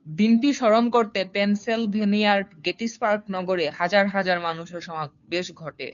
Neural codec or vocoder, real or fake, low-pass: codec, 16 kHz, 2 kbps, FunCodec, trained on Chinese and English, 25 frames a second; fake; 7.2 kHz